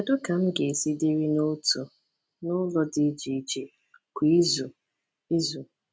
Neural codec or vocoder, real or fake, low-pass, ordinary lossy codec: none; real; none; none